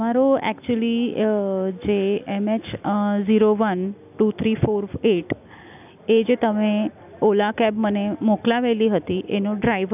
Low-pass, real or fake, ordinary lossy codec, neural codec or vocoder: 3.6 kHz; real; none; none